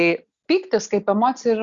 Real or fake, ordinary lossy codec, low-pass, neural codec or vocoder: real; Opus, 64 kbps; 7.2 kHz; none